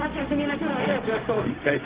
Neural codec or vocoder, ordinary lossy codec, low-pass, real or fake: codec, 16 kHz, 0.4 kbps, LongCat-Audio-Codec; Opus, 16 kbps; 3.6 kHz; fake